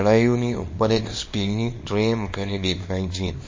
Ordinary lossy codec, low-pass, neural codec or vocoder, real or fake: MP3, 32 kbps; 7.2 kHz; codec, 24 kHz, 0.9 kbps, WavTokenizer, small release; fake